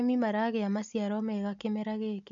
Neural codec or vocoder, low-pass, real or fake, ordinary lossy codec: none; 7.2 kHz; real; AAC, 64 kbps